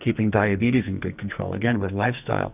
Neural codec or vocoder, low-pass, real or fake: codec, 44.1 kHz, 2.6 kbps, SNAC; 3.6 kHz; fake